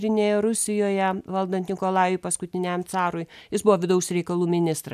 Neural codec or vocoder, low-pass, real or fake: none; 14.4 kHz; real